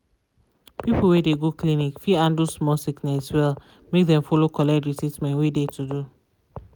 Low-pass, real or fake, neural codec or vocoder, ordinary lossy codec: none; real; none; none